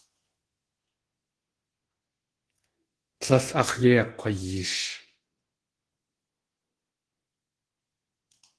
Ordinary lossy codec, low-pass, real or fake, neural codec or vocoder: Opus, 16 kbps; 10.8 kHz; fake; codec, 24 kHz, 0.9 kbps, DualCodec